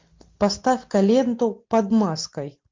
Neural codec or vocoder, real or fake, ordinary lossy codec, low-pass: none; real; MP3, 48 kbps; 7.2 kHz